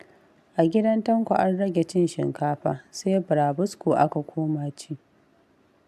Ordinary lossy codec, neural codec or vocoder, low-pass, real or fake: AAC, 96 kbps; none; 14.4 kHz; real